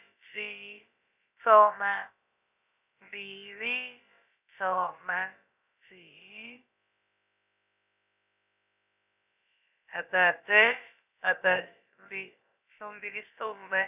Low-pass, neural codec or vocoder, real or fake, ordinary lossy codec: 3.6 kHz; codec, 16 kHz, about 1 kbps, DyCAST, with the encoder's durations; fake; none